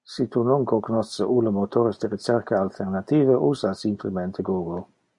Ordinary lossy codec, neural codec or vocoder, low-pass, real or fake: MP3, 48 kbps; none; 10.8 kHz; real